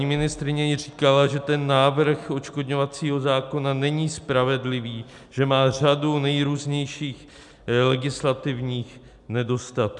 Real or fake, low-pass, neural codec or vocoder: real; 10.8 kHz; none